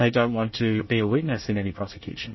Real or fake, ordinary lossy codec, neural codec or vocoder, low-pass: fake; MP3, 24 kbps; codec, 24 kHz, 1 kbps, SNAC; 7.2 kHz